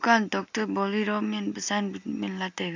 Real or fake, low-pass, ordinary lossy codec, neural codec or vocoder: real; 7.2 kHz; AAC, 48 kbps; none